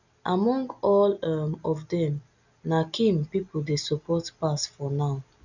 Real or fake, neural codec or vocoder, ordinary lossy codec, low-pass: real; none; none; 7.2 kHz